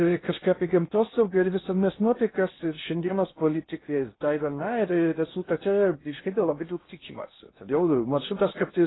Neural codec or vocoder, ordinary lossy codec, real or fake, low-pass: codec, 16 kHz in and 24 kHz out, 0.6 kbps, FocalCodec, streaming, 4096 codes; AAC, 16 kbps; fake; 7.2 kHz